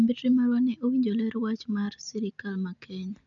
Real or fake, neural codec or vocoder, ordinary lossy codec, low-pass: real; none; none; 7.2 kHz